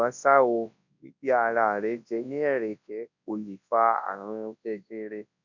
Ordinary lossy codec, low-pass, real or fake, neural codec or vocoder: none; 7.2 kHz; fake; codec, 24 kHz, 0.9 kbps, WavTokenizer, large speech release